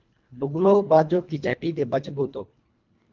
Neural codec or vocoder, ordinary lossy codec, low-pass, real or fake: codec, 24 kHz, 1.5 kbps, HILCodec; Opus, 24 kbps; 7.2 kHz; fake